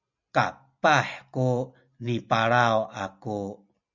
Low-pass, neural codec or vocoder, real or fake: 7.2 kHz; none; real